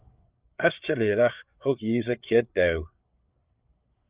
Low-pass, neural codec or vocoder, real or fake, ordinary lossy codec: 3.6 kHz; codec, 16 kHz, 8 kbps, FreqCodec, larger model; fake; Opus, 24 kbps